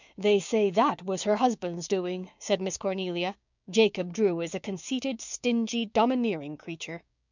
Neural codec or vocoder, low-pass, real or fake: codec, 16 kHz, 6 kbps, DAC; 7.2 kHz; fake